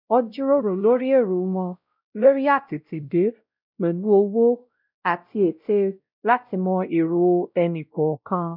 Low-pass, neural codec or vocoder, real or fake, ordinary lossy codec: 5.4 kHz; codec, 16 kHz, 0.5 kbps, X-Codec, WavLM features, trained on Multilingual LibriSpeech; fake; none